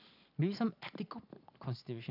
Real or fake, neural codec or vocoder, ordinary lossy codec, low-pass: real; none; none; 5.4 kHz